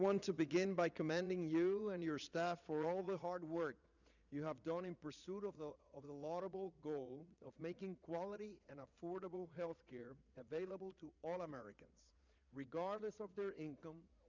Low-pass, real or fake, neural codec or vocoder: 7.2 kHz; fake; vocoder, 22.05 kHz, 80 mel bands, Vocos